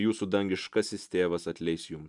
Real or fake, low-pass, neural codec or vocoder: real; 10.8 kHz; none